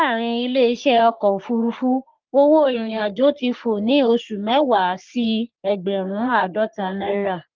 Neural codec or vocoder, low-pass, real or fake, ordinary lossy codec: codec, 44.1 kHz, 3.4 kbps, Pupu-Codec; 7.2 kHz; fake; Opus, 32 kbps